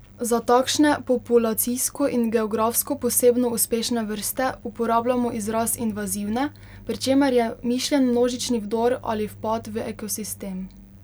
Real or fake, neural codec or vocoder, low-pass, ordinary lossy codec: real; none; none; none